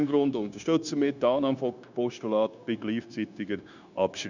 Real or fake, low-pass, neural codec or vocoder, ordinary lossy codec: fake; 7.2 kHz; codec, 16 kHz, 0.9 kbps, LongCat-Audio-Codec; none